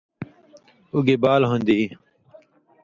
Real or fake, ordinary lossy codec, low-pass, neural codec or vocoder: real; Opus, 64 kbps; 7.2 kHz; none